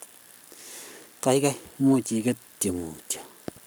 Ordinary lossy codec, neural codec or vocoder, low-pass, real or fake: none; codec, 44.1 kHz, 7.8 kbps, Pupu-Codec; none; fake